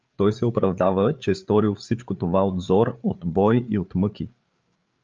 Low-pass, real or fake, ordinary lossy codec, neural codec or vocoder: 7.2 kHz; fake; Opus, 24 kbps; codec, 16 kHz, 8 kbps, FreqCodec, larger model